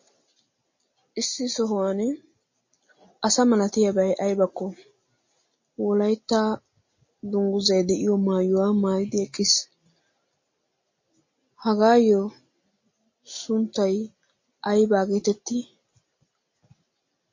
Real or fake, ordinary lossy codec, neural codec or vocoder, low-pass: real; MP3, 32 kbps; none; 7.2 kHz